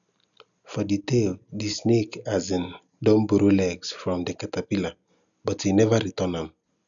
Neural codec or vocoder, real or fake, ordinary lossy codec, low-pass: none; real; none; 7.2 kHz